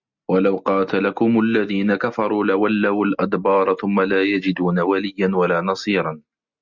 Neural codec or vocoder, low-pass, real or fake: none; 7.2 kHz; real